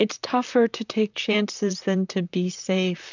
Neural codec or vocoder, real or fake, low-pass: vocoder, 44.1 kHz, 128 mel bands, Pupu-Vocoder; fake; 7.2 kHz